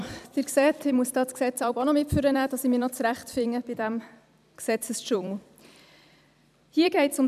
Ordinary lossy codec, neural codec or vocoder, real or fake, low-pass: none; vocoder, 44.1 kHz, 128 mel bands every 256 samples, BigVGAN v2; fake; 14.4 kHz